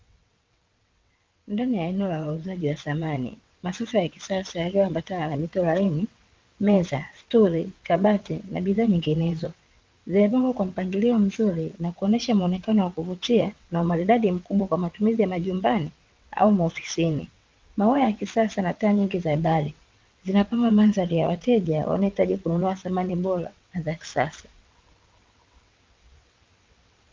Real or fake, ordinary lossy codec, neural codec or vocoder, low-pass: fake; Opus, 24 kbps; vocoder, 22.05 kHz, 80 mel bands, WaveNeXt; 7.2 kHz